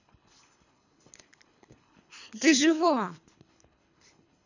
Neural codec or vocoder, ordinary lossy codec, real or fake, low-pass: codec, 24 kHz, 3 kbps, HILCodec; none; fake; 7.2 kHz